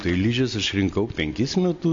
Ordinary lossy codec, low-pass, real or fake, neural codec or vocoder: MP3, 48 kbps; 7.2 kHz; real; none